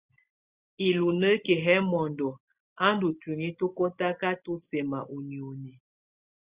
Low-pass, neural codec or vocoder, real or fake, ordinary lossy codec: 3.6 kHz; none; real; Opus, 64 kbps